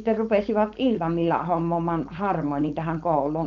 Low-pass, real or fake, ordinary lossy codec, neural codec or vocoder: 7.2 kHz; fake; none; codec, 16 kHz, 4.8 kbps, FACodec